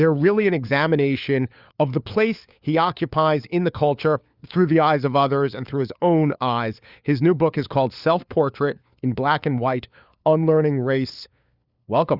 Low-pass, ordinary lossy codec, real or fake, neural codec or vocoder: 5.4 kHz; Opus, 64 kbps; fake; codec, 16 kHz, 4 kbps, FunCodec, trained on LibriTTS, 50 frames a second